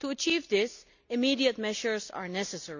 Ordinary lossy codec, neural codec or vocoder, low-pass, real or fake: none; none; 7.2 kHz; real